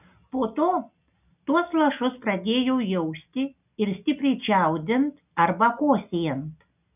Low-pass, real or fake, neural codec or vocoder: 3.6 kHz; real; none